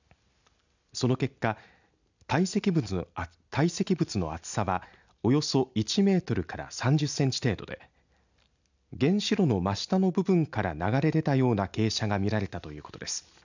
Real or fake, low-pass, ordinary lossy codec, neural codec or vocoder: real; 7.2 kHz; none; none